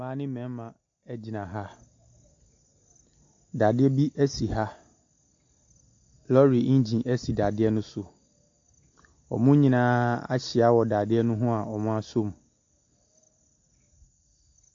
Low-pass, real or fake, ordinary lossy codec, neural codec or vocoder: 7.2 kHz; real; AAC, 48 kbps; none